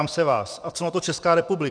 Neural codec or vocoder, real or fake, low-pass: vocoder, 24 kHz, 100 mel bands, Vocos; fake; 9.9 kHz